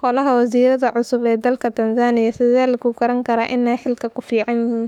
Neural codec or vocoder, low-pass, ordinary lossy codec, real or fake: autoencoder, 48 kHz, 32 numbers a frame, DAC-VAE, trained on Japanese speech; 19.8 kHz; none; fake